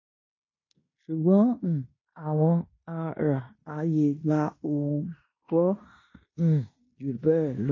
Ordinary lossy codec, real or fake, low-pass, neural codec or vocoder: MP3, 32 kbps; fake; 7.2 kHz; codec, 16 kHz in and 24 kHz out, 0.9 kbps, LongCat-Audio-Codec, fine tuned four codebook decoder